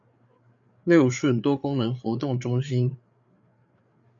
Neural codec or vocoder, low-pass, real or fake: codec, 16 kHz, 8 kbps, FreqCodec, larger model; 7.2 kHz; fake